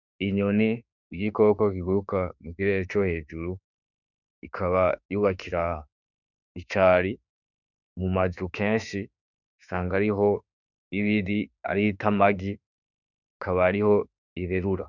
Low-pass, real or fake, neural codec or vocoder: 7.2 kHz; fake; autoencoder, 48 kHz, 32 numbers a frame, DAC-VAE, trained on Japanese speech